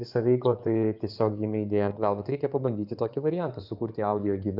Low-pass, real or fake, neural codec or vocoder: 5.4 kHz; fake; codec, 16 kHz in and 24 kHz out, 2.2 kbps, FireRedTTS-2 codec